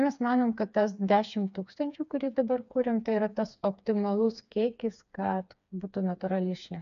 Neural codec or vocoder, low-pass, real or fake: codec, 16 kHz, 4 kbps, FreqCodec, smaller model; 7.2 kHz; fake